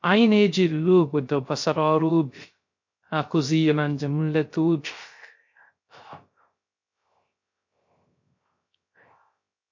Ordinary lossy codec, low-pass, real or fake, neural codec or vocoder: MP3, 48 kbps; 7.2 kHz; fake; codec, 16 kHz, 0.3 kbps, FocalCodec